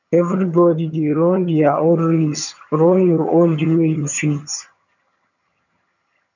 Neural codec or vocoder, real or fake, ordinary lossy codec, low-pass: vocoder, 22.05 kHz, 80 mel bands, HiFi-GAN; fake; none; 7.2 kHz